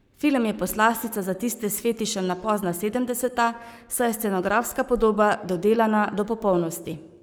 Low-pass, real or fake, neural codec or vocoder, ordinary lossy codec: none; fake; codec, 44.1 kHz, 7.8 kbps, Pupu-Codec; none